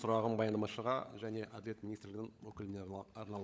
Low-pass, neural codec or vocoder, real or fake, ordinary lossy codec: none; codec, 16 kHz, 16 kbps, FunCodec, trained on LibriTTS, 50 frames a second; fake; none